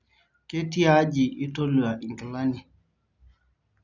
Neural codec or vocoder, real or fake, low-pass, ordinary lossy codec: none; real; 7.2 kHz; none